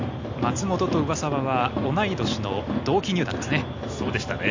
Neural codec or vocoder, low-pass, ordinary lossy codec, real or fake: none; 7.2 kHz; AAC, 48 kbps; real